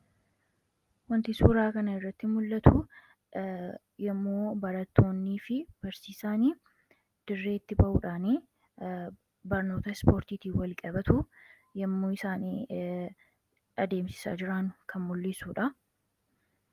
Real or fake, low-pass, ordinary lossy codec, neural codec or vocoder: real; 14.4 kHz; Opus, 32 kbps; none